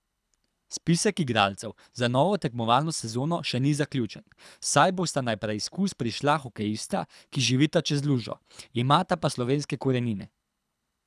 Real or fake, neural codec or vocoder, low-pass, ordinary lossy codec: fake; codec, 24 kHz, 6 kbps, HILCodec; none; none